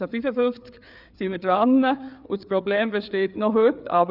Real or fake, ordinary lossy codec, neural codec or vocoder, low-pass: fake; none; codec, 16 kHz, 4 kbps, FreqCodec, larger model; 5.4 kHz